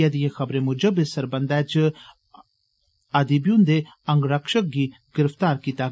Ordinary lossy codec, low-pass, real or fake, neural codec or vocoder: none; none; real; none